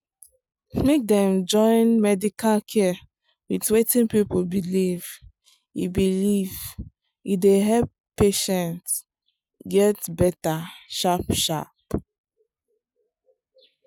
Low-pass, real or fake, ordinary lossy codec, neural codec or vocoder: none; real; none; none